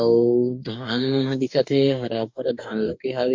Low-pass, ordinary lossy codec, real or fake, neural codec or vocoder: 7.2 kHz; MP3, 48 kbps; fake; codec, 44.1 kHz, 2.6 kbps, DAC